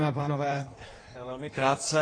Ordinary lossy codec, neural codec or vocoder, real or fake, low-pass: AAC, 32 kbps; codec, 16 kHz in and 24 kHz out, 1.1 kbps, FireRedTTS-2 codec; fake; 9.9 kHz